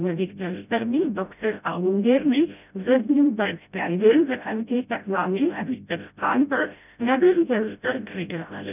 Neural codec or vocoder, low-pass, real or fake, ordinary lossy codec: codec, 16 kHz, 0.5 kbps, FreqCodec, smaller model; 3.6 kHz; fake; none